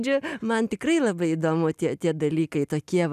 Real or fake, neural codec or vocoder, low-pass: real; none; 14.4 kHz